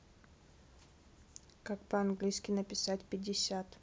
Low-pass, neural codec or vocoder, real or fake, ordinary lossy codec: none; none; real; none